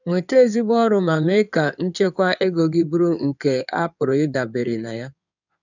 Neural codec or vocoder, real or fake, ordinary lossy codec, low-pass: codec, 16 kHz in and 24 kHz out, 2.2 kbps, FireRedTTS-2 codec; fake; none; 7.2 kHz